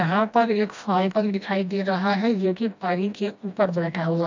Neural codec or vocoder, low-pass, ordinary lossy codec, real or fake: codec, 16 kHz, 1 kbps, FreqCodec, smaller model; 7.2 kHz; MP3, 64 kbps; fake